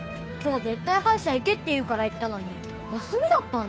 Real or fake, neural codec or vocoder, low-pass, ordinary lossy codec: fake; codec, 16 kHz, 2 kbps, FunCodec, trained on Chinese and English, 25 frames a second; none; none